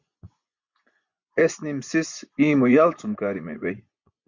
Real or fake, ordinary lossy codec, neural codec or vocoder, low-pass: fake; Opus, 64 kbps; vocoder, 24 kHz, 100 mel bands, Vocos; 7.2 kHz